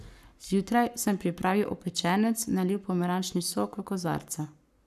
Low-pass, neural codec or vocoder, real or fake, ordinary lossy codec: 14.4 kHz; codec, 44.1 kHz, 7.8 kbps, Pupu-Codec; fake; none